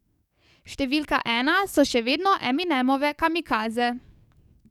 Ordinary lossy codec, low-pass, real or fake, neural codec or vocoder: none; 19.8 kHz; fake; codec, 44.1 kHz, 7.8 kbps, DAC